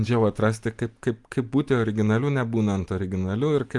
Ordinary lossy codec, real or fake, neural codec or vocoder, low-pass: Opus, 32 kbps; real; none; 10.8 kHz